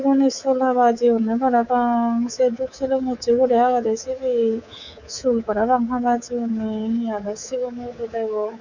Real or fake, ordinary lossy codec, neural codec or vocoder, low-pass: fake; none; codec, 24 kHz, 3.1 kbps, DualCodec; 7.2 kHz